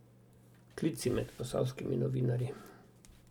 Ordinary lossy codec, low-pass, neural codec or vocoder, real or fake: none; 19.8 kHz; none; real